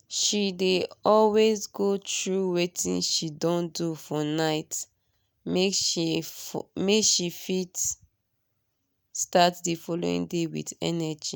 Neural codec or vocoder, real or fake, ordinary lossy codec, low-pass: none; real; none; none